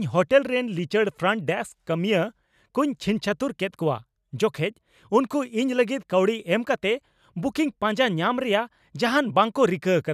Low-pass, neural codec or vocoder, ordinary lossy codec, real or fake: 14.4 kHz; none; none; real